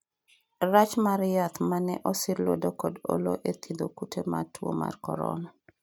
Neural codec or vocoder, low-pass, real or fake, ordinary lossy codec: none; none; real; none